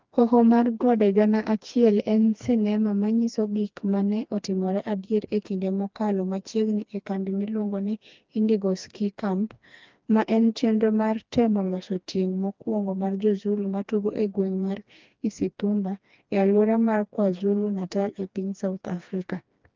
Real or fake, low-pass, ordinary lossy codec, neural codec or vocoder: fake; 7.2 kHz; Opus, 32 kbps; codec, 16 kHz, 2 kbps, FreqCodec, smaller model